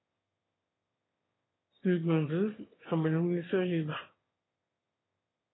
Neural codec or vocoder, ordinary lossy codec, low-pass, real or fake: autoencoder, 22.05 kHz, a latent of 192 numbers a frame, VITS, trained on one speaker; AAC, 16 kbps; 7.2 kHz; fake